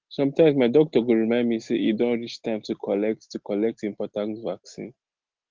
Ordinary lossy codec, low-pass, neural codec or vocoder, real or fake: Opus, 16 kbps; 7.2 kHz; none; real